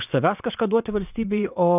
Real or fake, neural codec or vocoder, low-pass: fake; vocoder, 22.05 kHz, 80 mel bands, WaveNeXt; 3.6 kHz